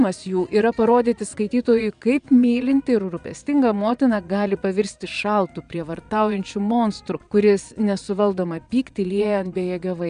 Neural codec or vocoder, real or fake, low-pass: vocoder, 22.05 kHz, 80 mel bands, Vocos; fake; 9.9 kHz